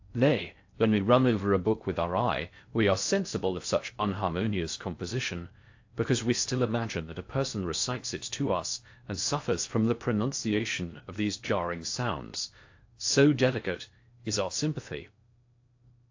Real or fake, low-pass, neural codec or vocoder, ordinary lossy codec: fake; 7.2 kHz; codec, 16 kHz in and 24 kHz out, 0.6 kbps, FocalCodec, streaming, 4096 codes; AAC, 48 kbps